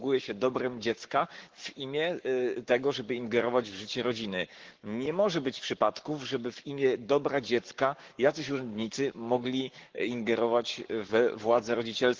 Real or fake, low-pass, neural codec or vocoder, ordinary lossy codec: fake; 7.2 kHz; codec, 44.1 kHz, 7.8 kbps, Pupu-Codec; Opus, 16 kbps